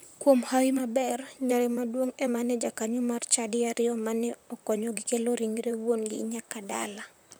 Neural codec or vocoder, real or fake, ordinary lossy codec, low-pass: vocoder, 44.1 kHz, 128 mel bands, Pupu-Vocoder; fake; none; none